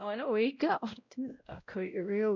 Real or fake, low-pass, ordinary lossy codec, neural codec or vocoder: fake; 7.2 kHz; none; codec, 16 kHz, 0.5 kbps, X-Codec, WavLM features, trained on Multilingual LibriSpeech